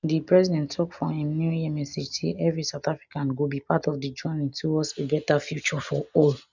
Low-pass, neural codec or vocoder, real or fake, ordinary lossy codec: 7.2 kHz; none; real; none